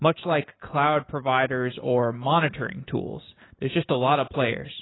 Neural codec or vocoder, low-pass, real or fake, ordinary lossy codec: none; 7.2 kHz; real; AAC, 16 kbps